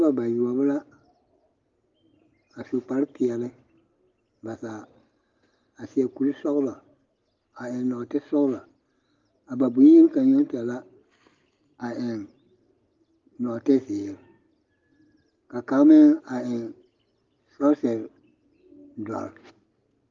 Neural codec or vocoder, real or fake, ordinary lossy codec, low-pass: none; real; Opus, 32 kbps; 7.2 kHz